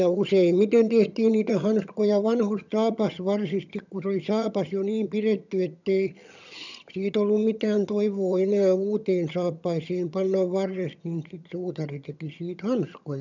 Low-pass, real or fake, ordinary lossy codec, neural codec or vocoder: 7.2 kHz; fake; none; vocoder, 22.05 kHz, 80 mel bands, HiFi-GAN